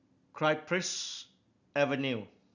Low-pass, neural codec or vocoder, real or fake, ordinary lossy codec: 7.2 kHz; none; real; none